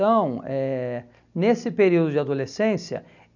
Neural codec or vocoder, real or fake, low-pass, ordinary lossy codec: none; real; 7.2 kHz; none